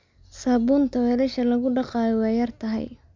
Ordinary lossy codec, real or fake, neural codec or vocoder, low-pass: MP3, 64 kbps; real; none; 7.2 kHz